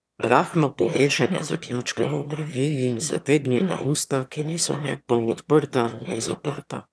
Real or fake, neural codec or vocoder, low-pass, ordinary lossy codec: fake; autoencoder, 22.05 kHz, a latent of 192 numbers a frame, VITS, trained on one speaker; none; none